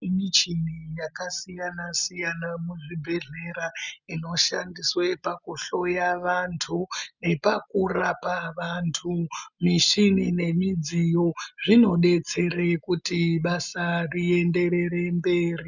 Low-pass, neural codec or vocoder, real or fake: 7.2 kHz; none; real